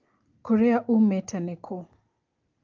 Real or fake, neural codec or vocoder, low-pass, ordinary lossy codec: real; none; 7.2 kHz; Opus, 32 kbps